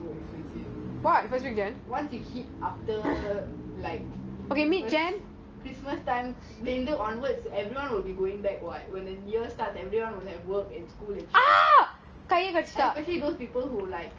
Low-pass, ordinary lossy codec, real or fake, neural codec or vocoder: 7.2 kHz; Opus, 24 kbps; real; none